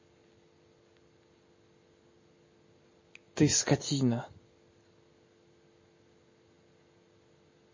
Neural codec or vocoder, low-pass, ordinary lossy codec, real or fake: none; 7.2 kHz; MP3, 32 kbps; real